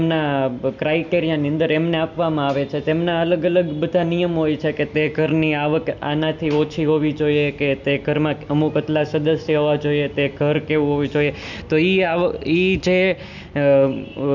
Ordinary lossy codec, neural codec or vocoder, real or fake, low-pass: none; none; real; 7.2 kHz